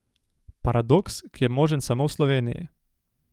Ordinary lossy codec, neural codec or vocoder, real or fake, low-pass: Opus, 32 kbps; codec, 44.1 kHz, 7.8 kbps, DAC; fake; 19.8 kHz